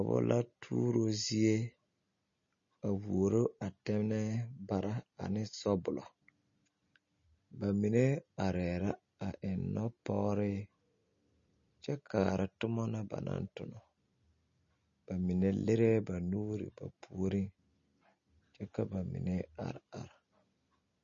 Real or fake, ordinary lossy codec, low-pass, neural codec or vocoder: real; MP3, 32 kbps; 7.2 kHz; none